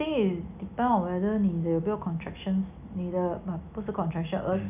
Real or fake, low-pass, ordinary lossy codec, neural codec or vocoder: real; 3.6 kHz; none; none